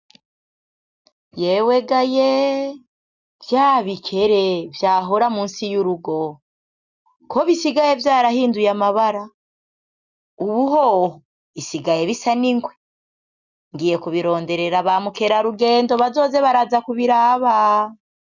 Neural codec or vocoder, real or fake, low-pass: none; real; 7.2 kHz